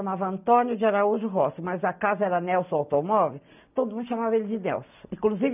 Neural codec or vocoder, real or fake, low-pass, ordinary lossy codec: none; real; 3.6 kHz; none